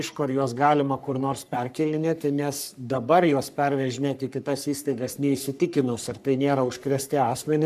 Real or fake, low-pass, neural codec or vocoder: fake; 14.4 kHz; codec, 44.1 kHz, 3.4 kbps, Pupu-Codec